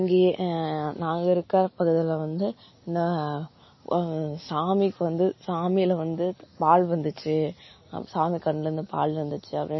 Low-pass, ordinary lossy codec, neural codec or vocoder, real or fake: 7.2 kHz; MP3, 24 kbps; none; real